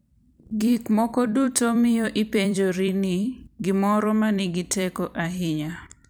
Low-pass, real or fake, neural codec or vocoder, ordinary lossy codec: none; fake; vocoder, 44.1 kHz, 128 mel bands every 256 samples, BigVGAN v2; none